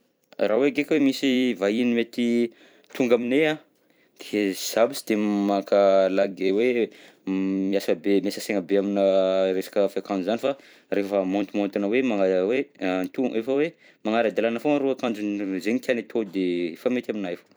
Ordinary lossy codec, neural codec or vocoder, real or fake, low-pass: none; vocoder, 44.1 kHz, 128 mel bands every 256 samples, BigVGAN v2; fake; none